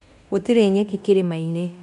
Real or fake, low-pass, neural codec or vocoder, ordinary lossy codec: fake; 10.8 kHz; codec, 16 kHz in and 24 kHz out, 0.9 kbps, LongCat-Audio-Codec, fine tuned four codebook decoder; none